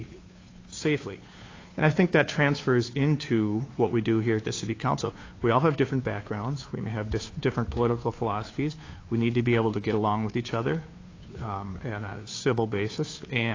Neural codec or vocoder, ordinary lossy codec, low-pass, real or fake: codec, 16 kHz, 2 kbps, FunCodec, trained on Chinese and English, 25 frames a second; AAC, 32 kbps; 7.2 kHz; fake